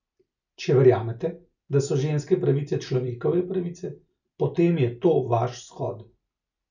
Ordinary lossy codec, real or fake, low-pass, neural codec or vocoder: none; real; 7.2 kHz; none